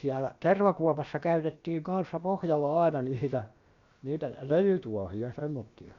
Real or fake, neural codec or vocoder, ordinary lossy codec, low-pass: fake; codec, 16 kHz, about 1 kbps, DyCAST, with the encoder's durations; Opus, 64 kbps; 7.2 kHz